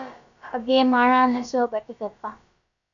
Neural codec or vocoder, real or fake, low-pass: codec, 16 kHz, about 1 kbps, DyCAST, with the encoder's durations; fake; 7.2 kHz